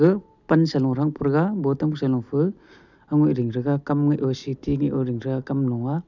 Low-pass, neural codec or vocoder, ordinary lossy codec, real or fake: 7.2 kHz; none; none; real